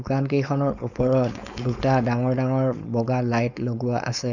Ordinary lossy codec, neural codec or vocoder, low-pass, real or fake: none; codec, 16 kHz, 4.8 kbps, FACodec; 7.2 kHz; fake